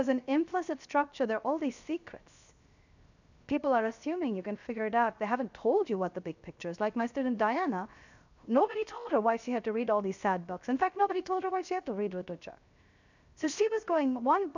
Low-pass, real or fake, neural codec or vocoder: 7.2 kHz; fake; codec, 16 kHz, 0.7 kbps, FocalCodec